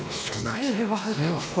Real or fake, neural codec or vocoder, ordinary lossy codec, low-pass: fake; codec, 16 kHz, 1 kbps, X-Codec, WavLM features, trained on Multilingual LibriSpeech; none; none